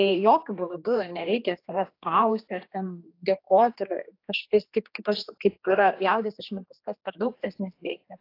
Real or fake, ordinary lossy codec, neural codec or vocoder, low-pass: fake; AAC, 32 kbps; codec, 16 kHz, 2 kbps, X-Codec, HuBERT features, trained on general audio; 5.4 kHz